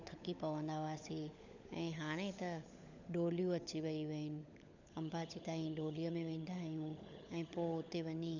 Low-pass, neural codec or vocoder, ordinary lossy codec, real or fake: 7.2 kHz; codec, 16 kHz, 16 kbps, FunCodec, trained on LibriTTS, 50 frames a second; none; fake